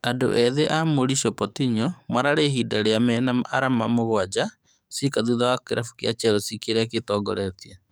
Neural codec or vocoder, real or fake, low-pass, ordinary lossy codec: codec, 44.1 kHz, 7.8 kbps, DAC; fake; none; none